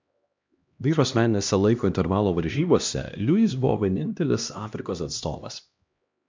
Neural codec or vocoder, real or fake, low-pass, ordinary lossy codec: codec, 16 kHz, 1 kbps, X-Codec, HuBERT features, trained on LibriSpeech; fake; 7.2 kHz; MP3, 64 kbps